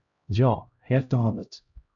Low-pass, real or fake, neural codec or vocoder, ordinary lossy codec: 7.2 kHz; fake; codec, 16 kHz, 0.5 kbps, X-Codec, HuBERT features, trained on LibriSpeech; AAC, 64 kbps